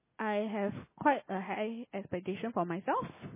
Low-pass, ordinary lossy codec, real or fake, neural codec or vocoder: 3.6 kHz; MP3, 16 kbps; real; none